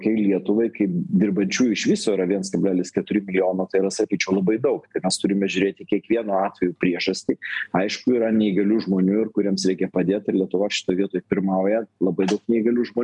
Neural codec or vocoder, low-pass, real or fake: none; 10.8 kHz; real